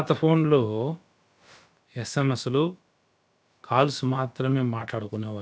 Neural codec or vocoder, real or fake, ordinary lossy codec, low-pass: codec, 16 kHz, about 1 kbps, DyCAST, with the encoder's durations; fake; none; none